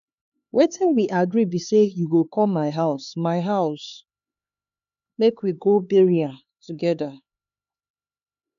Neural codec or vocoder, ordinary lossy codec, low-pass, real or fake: codec, 16 kHz, 4 kbps, X-Codec, HuBERT features, trained on LibriSpeech; none; 7.2 kHz; fake